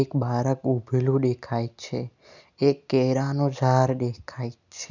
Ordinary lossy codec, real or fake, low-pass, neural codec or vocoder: none; real; 7.2 kHz; none